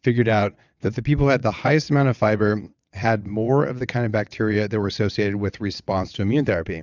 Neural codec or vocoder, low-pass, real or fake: vocoder, 22.05 kHz, 80 mel bands, WaveNeXt; 7.2 kHz; fake